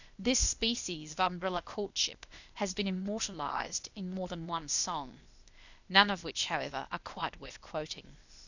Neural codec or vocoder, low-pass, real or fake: codec, 16 kHz, 0.8 kbps, ZipCodec; 7.2 kHz; fake